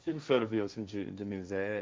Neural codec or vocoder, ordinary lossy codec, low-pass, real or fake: codec, 16 kHz, 1.1 kbps, Voila-Tokenizer; none; none; fake